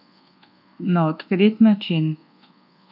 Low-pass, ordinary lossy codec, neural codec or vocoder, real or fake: 5.4 kHz; none; codec, 24 kHz, 1.2 kbps, DualCodec; fake